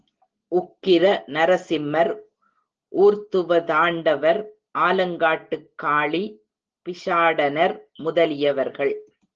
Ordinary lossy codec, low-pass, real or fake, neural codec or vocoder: Opus, 16 kbps; 7.2 kHz; real; none